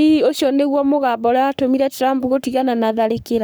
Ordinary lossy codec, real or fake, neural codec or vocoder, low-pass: none; fake; codec, 44.1 kHz, 7.8 kbps, Pupu-Codec; none